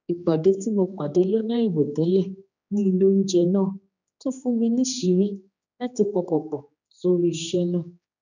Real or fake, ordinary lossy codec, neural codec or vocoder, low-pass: fake; none; codec, 16 kHz, 2 kbps, X-Codec, HuBERT features, trained on general audio; 7.2 kHz